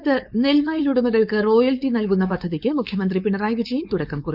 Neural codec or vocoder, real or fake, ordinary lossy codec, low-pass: codec, 16 kHz, 4.8 kbps, FACodec; fake; none; 5.4 kHz